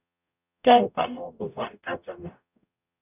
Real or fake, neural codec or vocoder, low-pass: fake; codec, 44.1 kHz, 0.9 kbps, DAC; 3.6 kHz